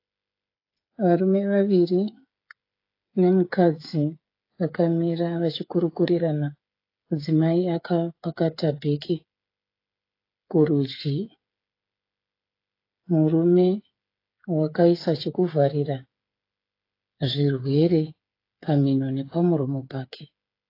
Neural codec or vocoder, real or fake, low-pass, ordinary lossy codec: codec, 16 kHz, 8 kbps, FreqCodec, smaller model; fake; 5.4 kHz; AAC, 32 kbps